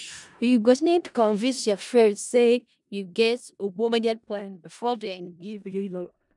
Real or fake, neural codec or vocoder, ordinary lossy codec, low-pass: fake; codec, 16 kHz in and 24 kHz out, 0.4 kbps, LongCat-Audio-Codec, four codebook decoder; none; 10.8 kHz